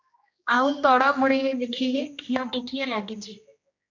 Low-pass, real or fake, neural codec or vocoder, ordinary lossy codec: 7.2 kHz; fake; codec, 16 kHz, 1 kbps, X-Codec, HuBERT features, trained on general audio; AAC, 48 kbps